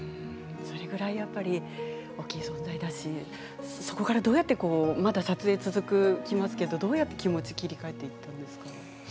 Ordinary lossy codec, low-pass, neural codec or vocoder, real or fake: none; none; none; real